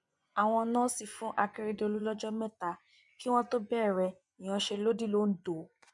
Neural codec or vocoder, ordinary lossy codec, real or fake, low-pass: vocoder, 24 kHz, 100 mel bands, Vocos; none; fake; 10.8 kHz